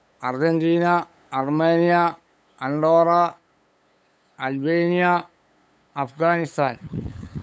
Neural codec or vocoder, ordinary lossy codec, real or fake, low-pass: codec, 16 kHz, 8 kbps, FunCodec, trained on LibriTTS, 25 frames a second; none; fake; none